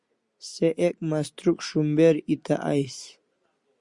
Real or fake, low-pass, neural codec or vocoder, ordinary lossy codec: real; 10.8 kHz; none; Opus, 64 kbps